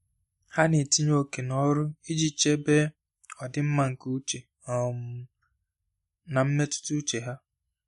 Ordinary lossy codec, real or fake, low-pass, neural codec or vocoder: MP3, 48 kbps; real; 19.8 kHz; none